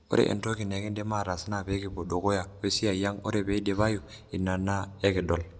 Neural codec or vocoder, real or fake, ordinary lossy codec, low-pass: none; real; none; none